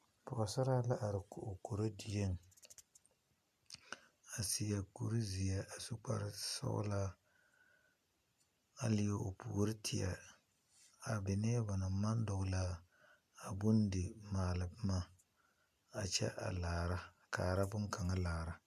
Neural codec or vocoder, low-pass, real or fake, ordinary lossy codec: none; 14.4 kHz; real; MP3, 96 kbps